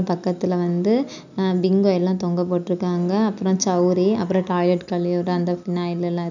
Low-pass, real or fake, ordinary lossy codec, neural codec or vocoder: 7.2 kHz; real; none; none